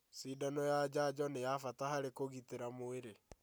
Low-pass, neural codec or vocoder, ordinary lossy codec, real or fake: none; none; none; real